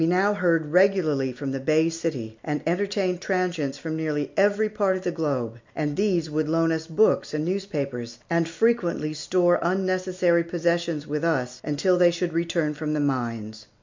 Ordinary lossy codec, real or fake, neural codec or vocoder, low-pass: MP3, 64 kbps; real; none; 7.2 kHz